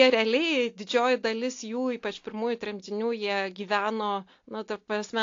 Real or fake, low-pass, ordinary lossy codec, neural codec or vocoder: real; 7.2 kHz; AAC, 48 kbps; none